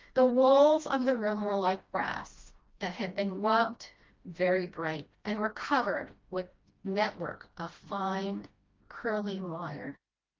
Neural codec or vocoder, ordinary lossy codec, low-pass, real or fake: codec, 16 kHz, 1 kbps, FreqCodec, smaller model; Opus, 24 kbps; 7.2 kHz; fake